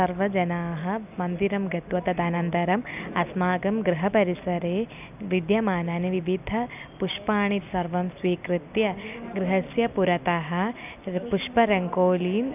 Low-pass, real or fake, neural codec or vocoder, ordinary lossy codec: 3.6 kHz; real; none; none